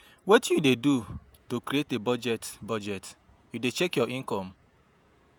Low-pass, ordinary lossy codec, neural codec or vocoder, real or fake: none; none; vocoder, 48 kHz, 128 mel bands, Vocos; fake